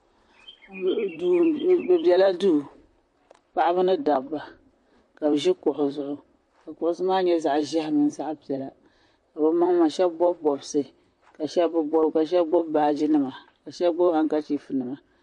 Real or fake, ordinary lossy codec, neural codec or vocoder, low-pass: fake; MP3, 48 kbps; vocoder, 44.1 kHz, 128 mel bands, Pupu-Vocoder; 10.8 kHz